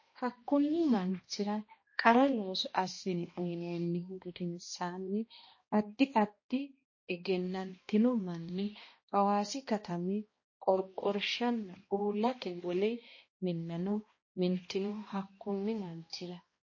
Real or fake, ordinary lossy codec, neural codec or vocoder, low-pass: fake; MP3, 32 kbps; codec, 16 kHz, 1 kbps, X-Codec, HuBERT features, trained on balanced general audio; 7.2 kHz